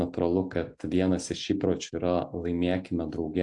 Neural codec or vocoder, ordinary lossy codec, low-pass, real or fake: none; AAC, 64 kbps; 10.8 kHz; real